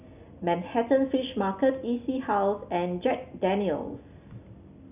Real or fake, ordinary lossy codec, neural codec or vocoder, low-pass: real; none; none; 3.6 kHz